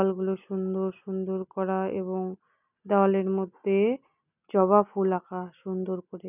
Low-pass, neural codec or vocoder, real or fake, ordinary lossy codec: 3.6 kHz; none; real; none